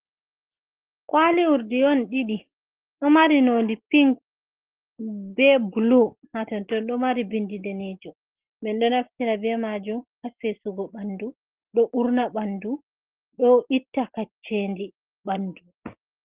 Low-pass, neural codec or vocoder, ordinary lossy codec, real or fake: 3.6 kHz; none; Opus, 16 kbps; real